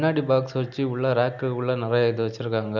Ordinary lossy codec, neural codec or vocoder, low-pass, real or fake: none; none; 7.2 kHz; real